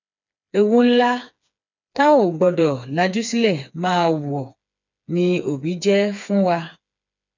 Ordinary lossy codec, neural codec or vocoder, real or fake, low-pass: none; codec, 16 kHz, 4 kbps, FreqCodec, smaller model; fake; 7.2 kHz